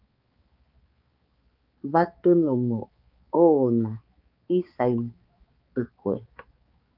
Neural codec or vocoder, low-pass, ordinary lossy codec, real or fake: codec, 16 kHz, 4 kbps, X-Codec, HuBERT features, trained on balanced general audio; 5.4 kHz; Opus, 24 kbps; fake